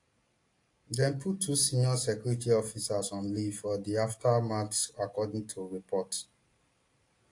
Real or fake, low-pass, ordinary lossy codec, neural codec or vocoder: real; 10.8 kHz; AAC, 48 kbps; none